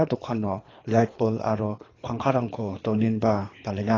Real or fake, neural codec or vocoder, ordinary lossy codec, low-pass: fake; codec, 24 kHz, 3 kbps, HILCodec; AAC, 32 kbps; 7.2 kHz